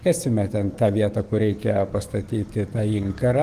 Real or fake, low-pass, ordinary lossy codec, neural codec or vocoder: fake; 14.4 kHz; Opus, 24 kbps; codec, 44.1 kHz, 7.8 kbps, DAC